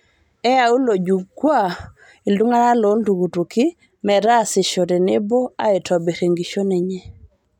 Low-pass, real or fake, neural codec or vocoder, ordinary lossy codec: 19.8 kHz; real; none; none